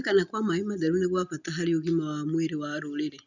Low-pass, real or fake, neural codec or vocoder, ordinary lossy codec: 7.2 kHz; real; none; none